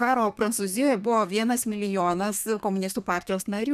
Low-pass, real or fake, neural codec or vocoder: 14.4 kHz; fake; codec, 32 kHz, 1.9 kbps, SNAC